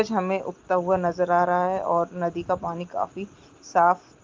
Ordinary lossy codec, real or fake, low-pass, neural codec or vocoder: Opus, 32 kbps; real; 7.2 kHz; none